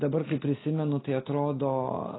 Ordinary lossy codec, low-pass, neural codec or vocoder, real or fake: AAC, 16 kbps; 7.2 kHz; none; real